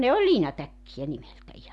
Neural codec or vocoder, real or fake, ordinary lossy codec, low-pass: none; real; none; none